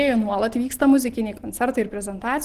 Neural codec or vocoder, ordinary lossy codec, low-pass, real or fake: none; Opus, 16 kbps; 14.4 kHz; real